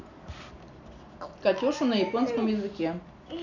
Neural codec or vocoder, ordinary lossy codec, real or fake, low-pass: none; none; real; 7.2 kHz